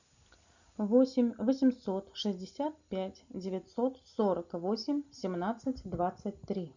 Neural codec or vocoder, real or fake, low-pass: none; real; 7.2 kHz